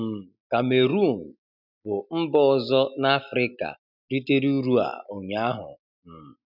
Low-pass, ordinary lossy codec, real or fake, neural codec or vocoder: 5.4 kHz; none; real; none